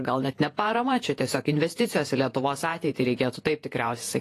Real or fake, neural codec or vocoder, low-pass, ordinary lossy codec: fake; vocoder, 48 kHz, 128 mel bands, Vocos; 14.4 kHz; AAC, 48 kbps